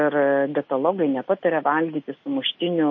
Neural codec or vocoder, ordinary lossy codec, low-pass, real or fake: none; MP3, 32 kbps; 7.2 kHz; real